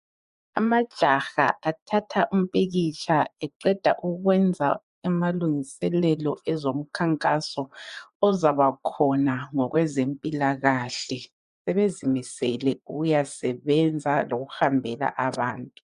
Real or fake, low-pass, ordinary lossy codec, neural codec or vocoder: fake; 10.8 kHz; MP3, 96 kbps; vocoder, 24 kHz, 100 mel bands, Vocos